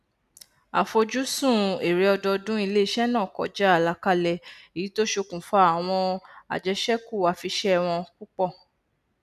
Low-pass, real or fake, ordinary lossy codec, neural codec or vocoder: 14.4 kHz; real; none; none